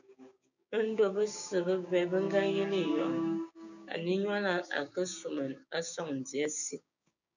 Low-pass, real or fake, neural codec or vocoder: 7.2 kHz; fake; codec, 16 kHz, 6 kbps, DAC